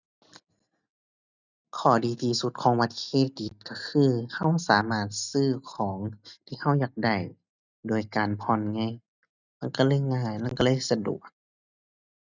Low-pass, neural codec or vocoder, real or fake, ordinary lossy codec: 7.2 kHz; none; real; none